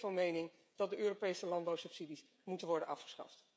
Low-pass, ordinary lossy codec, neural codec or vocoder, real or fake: none; none; codec, 16 kHz, 16 kbps, FreqCodec, smaller model; fake